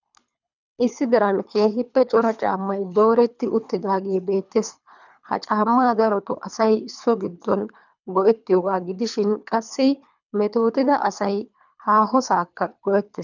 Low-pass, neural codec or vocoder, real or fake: 7.2 kHz; codec, 24 kHz, 3 kbps, HILCodec; fake